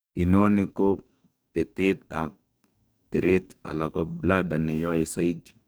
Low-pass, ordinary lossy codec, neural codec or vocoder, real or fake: none; none; codec, 44.1 kHz, 2.6 kbps, DAC; fake